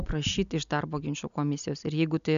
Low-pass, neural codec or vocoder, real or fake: 7.2 kHz; none; real